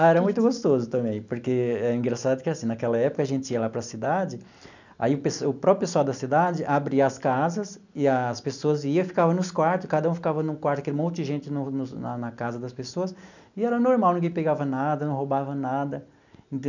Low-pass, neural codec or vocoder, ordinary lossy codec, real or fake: 7.2 kHz; none; none; real